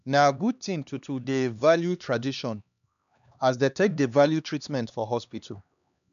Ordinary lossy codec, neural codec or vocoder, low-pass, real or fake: none; codec, 16 kHz, 2 kbps, X-Codec, HuBERT features, trained on LibriSpeech; 7.2 kHz; fake